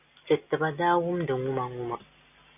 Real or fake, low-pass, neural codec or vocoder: real; 3.6 kHz; none